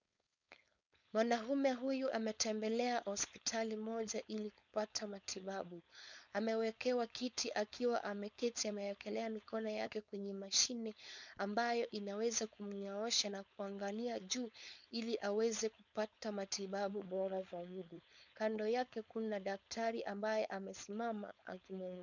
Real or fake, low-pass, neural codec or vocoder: fake; 7.2 kHz; codec, 16 kHz, 4.8 kbps, FACodec